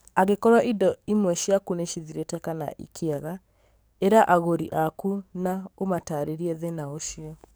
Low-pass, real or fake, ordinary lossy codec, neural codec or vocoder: none; fake; none; codec, 44.1 kHz, 7.8 kbps, DAC